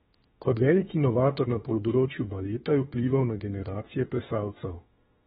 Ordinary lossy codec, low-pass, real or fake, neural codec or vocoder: AAC, 16 kbps; 19.8 kHz; fake; autoencoder, 48 kHz, 32 numbers a frame, DAC-VAE, trained on Japanese speech